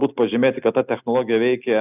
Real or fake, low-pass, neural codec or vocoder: real; 3.6 kHz; none